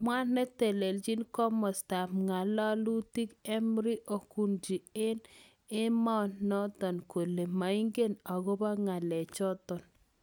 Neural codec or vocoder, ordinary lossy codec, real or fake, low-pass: none; none; real; none